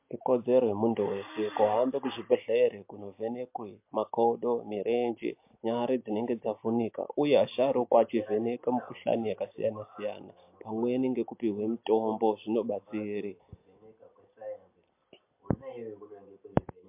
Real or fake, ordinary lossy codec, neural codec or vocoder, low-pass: real; MP3, 32 kbps; none; 3.6 kHz